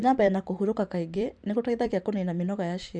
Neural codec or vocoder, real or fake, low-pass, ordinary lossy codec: vocoder, 44.1 kHz, 128 mel bands every 256 samples, BigVGAN v2; fake; 9.9 kHz; none